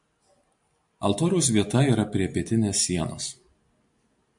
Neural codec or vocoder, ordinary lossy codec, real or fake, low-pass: none; MP3, 64 kbps; real; 10.8 kHz